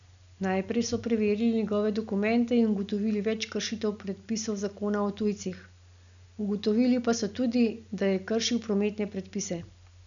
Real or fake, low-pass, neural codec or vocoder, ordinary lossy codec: real; 7.2 kHz; none; none